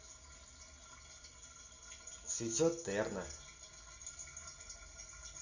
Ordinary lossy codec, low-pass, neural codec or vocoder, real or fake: none; 7.2 kHz; none; real